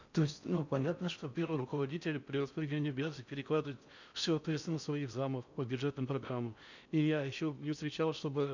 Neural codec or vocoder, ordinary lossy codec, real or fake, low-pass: codec, 16 kHz in and 24 kHz out, 0.6 kbps, FocalCodec, streaming, 4096 codes; none; fake; 7.2 kHz